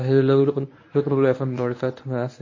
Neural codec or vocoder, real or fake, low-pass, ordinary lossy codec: codec, 24 kHz, 0.9 kbps, WavTokenizer, medium speech release version 1; fake; 7.2 kHz; MP3, 32 kbps